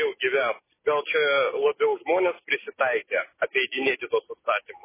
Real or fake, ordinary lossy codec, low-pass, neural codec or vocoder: real; MP3, 16 kbps; 3.6 kHz; none